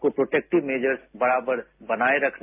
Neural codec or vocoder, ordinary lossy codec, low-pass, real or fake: none; AAC, 32 kbps; 3.6 kHz; real